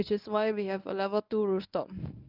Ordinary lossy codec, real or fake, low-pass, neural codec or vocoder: none; fake; 5.4 kHz; codec, 24 kHz, 0.9 kbps, WavTokenizer, medium speech release version 1